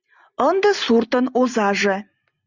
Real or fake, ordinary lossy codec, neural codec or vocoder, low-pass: real; Opus, 64 kbps; none; 7.2 kHz